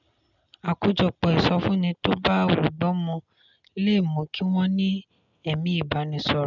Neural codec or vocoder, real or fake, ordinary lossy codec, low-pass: none; real; none; 7.2 kHz